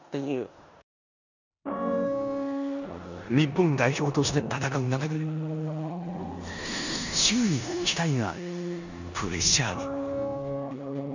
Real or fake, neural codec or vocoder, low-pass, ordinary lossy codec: fake; codec, 16 kHz in and 24 kHz out, 0.9 kbps, LongCat-Audio-Codec, four codebook decoder; 7.2 kHz; none